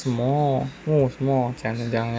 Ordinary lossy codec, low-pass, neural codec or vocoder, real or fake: none; none; none; real